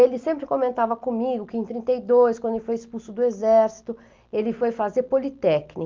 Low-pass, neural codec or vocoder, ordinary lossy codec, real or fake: 7.2 kHz; none; Opus, 24 kbps; real